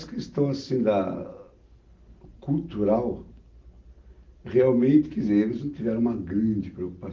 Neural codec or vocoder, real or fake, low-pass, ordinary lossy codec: none; real; 7.2 kHz; Opus, 32 kbps